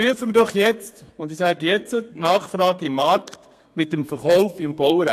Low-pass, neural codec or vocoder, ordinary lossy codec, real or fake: 14.4 kHz; codec, 32 kHz, 1.9 kbps, SNAC; AAC, 64 kbps; fake